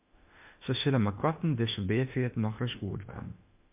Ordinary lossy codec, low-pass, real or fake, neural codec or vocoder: MP3, 32 kbps; 3.6 kHz; fake; autoencoder, 48 kHz, 32 numbers a frame, DAC-VAE, trained on Japanese speech